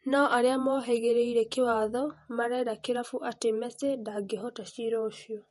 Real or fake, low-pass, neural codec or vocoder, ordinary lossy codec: fake; 19.8 kHz; vocoder, 48 kHz, 128 mel bands, Vocos; MP3, 48 kbps